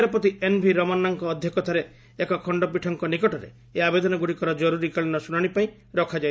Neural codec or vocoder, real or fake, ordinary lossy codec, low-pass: none; real; none; none